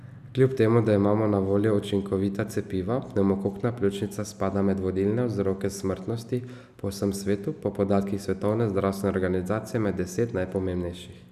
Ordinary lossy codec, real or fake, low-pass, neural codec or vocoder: none; real; 14.4 kHz; none